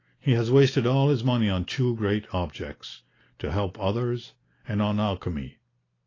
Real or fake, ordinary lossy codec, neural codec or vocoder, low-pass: real; AAC, 32 kbps; none; 7.2 kHz